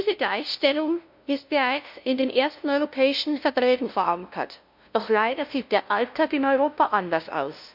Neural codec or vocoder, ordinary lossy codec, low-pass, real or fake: codec, 16 kHz, 0.5 kbps, FunCodec, trained on LibriTTS, 25 frames a second; MP3, 48 kbps; 5.4 kHz; fake